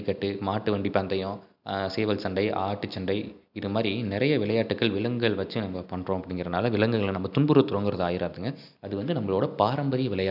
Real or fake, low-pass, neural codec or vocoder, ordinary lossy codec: real; 5.4 kHz; none; none